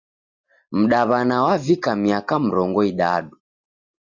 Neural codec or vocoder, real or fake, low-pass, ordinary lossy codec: none; real; 7.2 kHz; Opus, 64 kbps